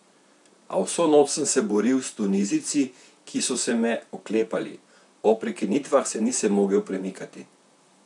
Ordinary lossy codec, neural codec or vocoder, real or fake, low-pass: none; vocoder, 44.1 kHz, 128 mel bands, Pupu-Vocoder; fake; 10.8 kHz